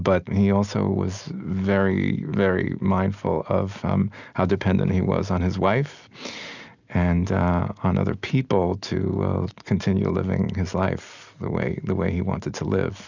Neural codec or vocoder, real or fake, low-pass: none; real; 7.2 kHz